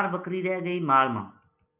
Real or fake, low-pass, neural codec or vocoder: real; 3.6 kHz; none